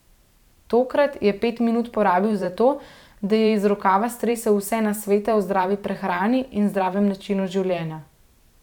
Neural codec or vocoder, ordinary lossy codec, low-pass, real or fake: vocoder, 44.1 kHz, 128 mel bands every 512 samples, BigVGAN v2; none; 19.8 kHz; fake